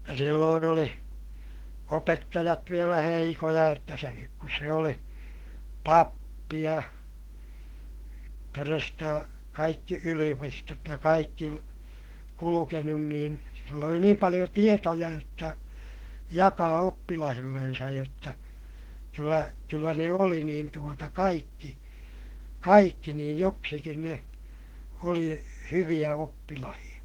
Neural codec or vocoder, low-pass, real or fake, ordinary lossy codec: autoencoder, 48 kHz, 32 numbers a frame, DAC-VAE, trained on Japanese speech; 19.8 kHz; fake; Opus, 16 kbps